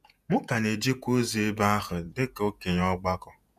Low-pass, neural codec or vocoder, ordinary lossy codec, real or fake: 14.4 kHz; vocoder, 48 kHz, 128 mel bands, Vocos; none; fake